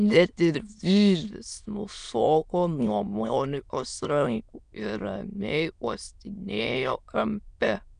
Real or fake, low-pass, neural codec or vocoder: fake; 9.9 kHz; autoencoder, 22.05 kHz, a latent of 192 numbers a frame, VITS, trained on many speakers